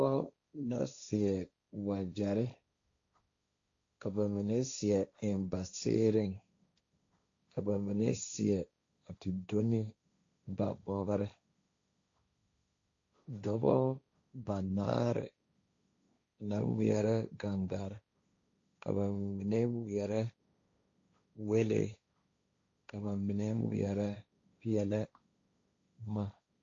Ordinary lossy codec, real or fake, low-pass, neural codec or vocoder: AAC, 48 kbps; fake; 7.2 kHz; codec, 16 kHz, 1.1 kbps, Voila-Tokenizer